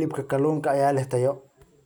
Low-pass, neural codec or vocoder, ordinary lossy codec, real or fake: none; none; none; real